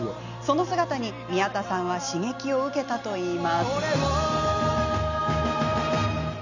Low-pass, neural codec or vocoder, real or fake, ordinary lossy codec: 7.2 kHz; none; real; none